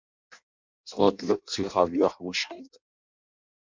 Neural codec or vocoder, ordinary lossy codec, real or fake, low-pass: codec, 16 kHz in and 24 kHz out, 0.6 kbps, FireRedTTS-2 codec; MP3, 64 kbps; fake; 7.2 kHz